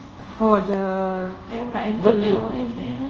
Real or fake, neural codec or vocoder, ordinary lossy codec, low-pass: fake; codec, 24 kHz, 0.5 kbps, DualCodec; Opus, 24 kbps; 7.2 kHz